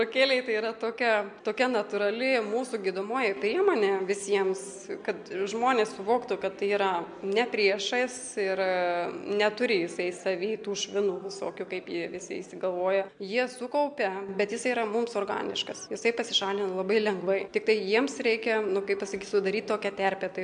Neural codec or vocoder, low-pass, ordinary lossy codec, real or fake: none; 9.9 kHz; MP3, 64 kbps; real